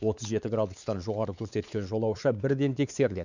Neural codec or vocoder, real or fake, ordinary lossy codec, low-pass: codec, 16 kHz, 4 kbps, X-Codec, WavLM features, trained on Multilingual LibriSpeech; fake; none; 7.2 kHz